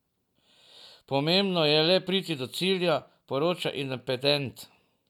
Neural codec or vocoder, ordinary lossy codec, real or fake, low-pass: none; none; real; 19.8 kHz